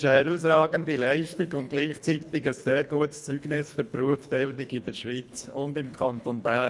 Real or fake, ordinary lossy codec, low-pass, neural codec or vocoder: fake; none; none; codec, 24 kHz, 1.5 kbps, HILCodec